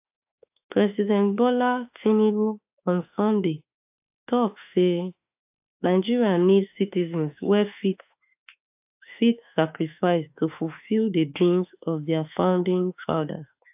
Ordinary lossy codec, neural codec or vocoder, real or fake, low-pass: AAC, 32 kbps; autoencoder, 48 kHz, 32 numbers a frame, DAC-VAE, trained on Japanese speech; fake; 3.6 kHz